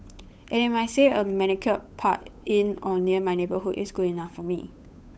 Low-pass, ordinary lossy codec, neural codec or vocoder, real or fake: none; none; codec, 16 kHz, 8 kbps, FunCodec, trained on Chinese and English, 25 frames a second; fake